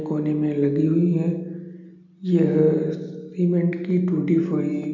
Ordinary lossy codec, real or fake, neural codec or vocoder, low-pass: none; real; none; 7.2 kHz